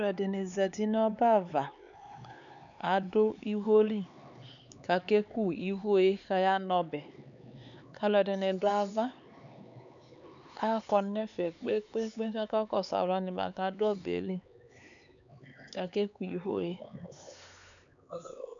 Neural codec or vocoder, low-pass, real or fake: codec, 16 kHz, 4 kbps, X-Codec, HuBERT features, trained on LibriSpeech; 7.2 kHz; fake